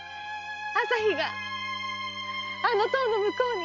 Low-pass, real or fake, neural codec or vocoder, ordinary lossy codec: 7.2 kHz; real; none; none